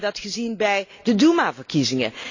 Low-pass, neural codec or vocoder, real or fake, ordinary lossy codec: 7.2 kHz; none; real; AAC, 48 kbps